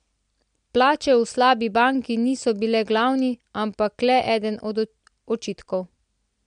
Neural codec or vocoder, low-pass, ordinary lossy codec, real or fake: none; 9.9 kHz; MP3, 64 kbps; real